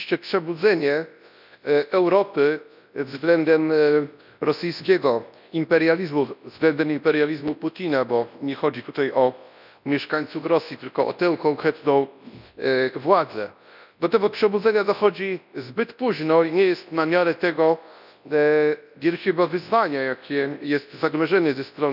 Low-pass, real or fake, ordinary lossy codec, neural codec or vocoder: 5.4 kHz; fake; none; codec, 24 kHz, 0.9 kbps, WavTokenizer, large speech release